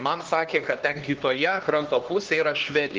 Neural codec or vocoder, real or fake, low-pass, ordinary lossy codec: codec, 16 kHz, 2 kbps, X-Codec, HuBERT features, trained on LibriSpeech; fake; 7.2 kHz; Opus, 16 kbps